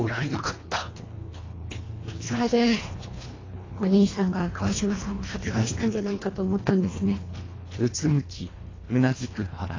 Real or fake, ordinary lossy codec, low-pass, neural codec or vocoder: fake; AAC, 32 kbps; 7.2 kHz; codec, 24 kHz, 1.5 kbps, HILCodec